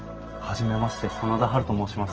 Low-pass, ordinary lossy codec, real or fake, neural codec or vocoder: 7.2 kHz; Opus, 16 kbps; real; none